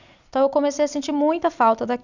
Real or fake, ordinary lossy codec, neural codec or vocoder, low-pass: real; none; none; 7.2 kHz